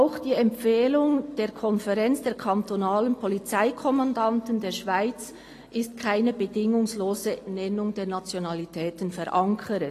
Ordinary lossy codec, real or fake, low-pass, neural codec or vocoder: AAC, 48 kbps; real; 14.4 kHz; none